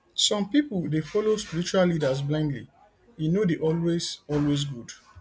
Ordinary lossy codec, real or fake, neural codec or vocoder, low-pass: none; real; none; none